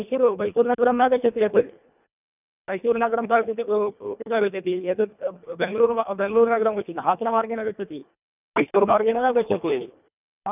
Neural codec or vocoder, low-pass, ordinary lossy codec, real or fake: codec, 24 kHz, 1.5 kbps, HILCodec; 3.6 kHz; none; fake